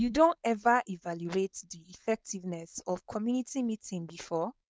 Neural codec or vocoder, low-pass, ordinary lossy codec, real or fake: codec, 16 kHz, 4.8 kbps, FACodec; none; none; fake